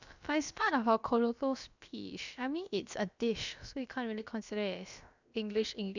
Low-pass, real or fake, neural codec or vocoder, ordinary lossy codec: 7.2 kHz; fake; codec, 16 kHz, about 1 kbps, DyCAST, with the encoder's durations; none